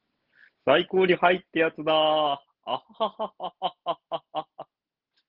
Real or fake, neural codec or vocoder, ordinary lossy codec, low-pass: real; none; Opus, 16 kbps; 5.4 kHz